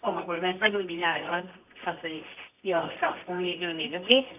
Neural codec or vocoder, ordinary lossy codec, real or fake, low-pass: codec, 24 kHz, 0.9 kbps, WavTokenizer, medium music audio release; none; fake; 3.6 kHz